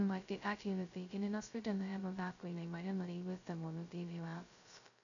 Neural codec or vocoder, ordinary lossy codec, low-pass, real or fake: codec, 16 kHz, 0.2 kbps, FocalCodec; none; 7.2 kHz; fake